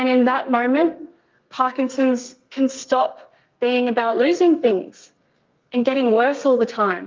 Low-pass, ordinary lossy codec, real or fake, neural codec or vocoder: 7.2 kHz; Opus, 32 kbps; fake; codec, 32 kHz, 1.9 kbps, SNAC